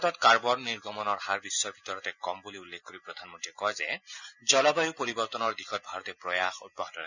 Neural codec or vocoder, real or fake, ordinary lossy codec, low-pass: none; real; none; 7.2 kHz